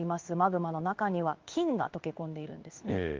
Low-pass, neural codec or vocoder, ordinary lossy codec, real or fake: 7.2 kHz; codec, 16 kHz in and 24 kHz out, 1 kbps, XY-Tokenizer; Opus, 32 kbps; fake